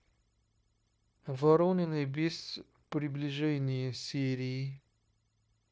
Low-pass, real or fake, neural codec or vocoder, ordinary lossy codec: none; fake; codec, 16 kHz, 0.9 kbps, LongCat-Audio-Codec; none